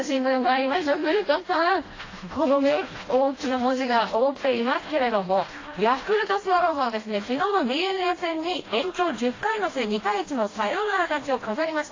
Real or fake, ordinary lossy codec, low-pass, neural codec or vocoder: fake; AAC, 32 kbps; 7.2 kHz; codec, 16 kHz, 1 kbps, FreqCodec, smaller model